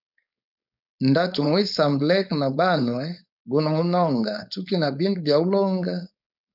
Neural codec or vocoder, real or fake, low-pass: codec, 16 kHz, 4.8 kbps, FACodec; fake; 5.4 kHz